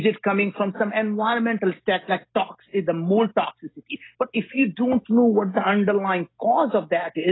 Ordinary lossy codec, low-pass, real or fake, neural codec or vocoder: AAC, 16 kbps; 7.2 kHz; real; none